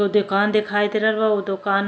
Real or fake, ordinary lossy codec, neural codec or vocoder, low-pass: real; none; none; none